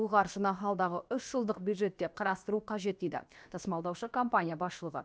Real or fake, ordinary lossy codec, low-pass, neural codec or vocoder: fake; none; none; codec, 16 kHz, about 1 kbps, DyCAST, with the encoder's durations